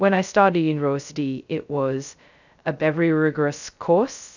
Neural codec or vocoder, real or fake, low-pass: codec, 16 kHz, 0.2 kbps, FocalCodec; fake; 7.2 kHz